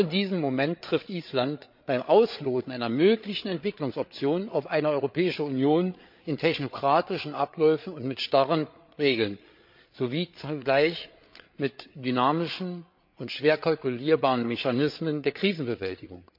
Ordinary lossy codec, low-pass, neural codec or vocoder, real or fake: MP3, 48 kbps; 5.4 kHz; codec, 16 kHz, 8 kbps, FreqCodec, larger model; fake